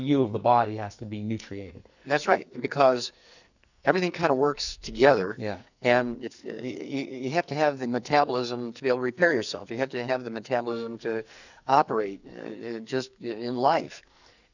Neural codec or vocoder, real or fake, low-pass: codec, 44.1 kHz, 2.6 kbps, SNAC; fake; 7.2 kHz